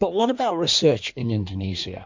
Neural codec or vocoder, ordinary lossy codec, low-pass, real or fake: codec, 16 kHz in and 24 kHz out, 1.1 kbps, FireRedTTS-2 codec; MP3, 48 kbps; 7.2 kHz; fake